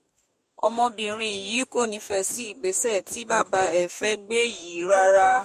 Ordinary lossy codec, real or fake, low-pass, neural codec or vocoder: MP3, 64 kbps; fake; 14.4 kHz; codec, 44.1 kHz, 2.6 kbps, DAC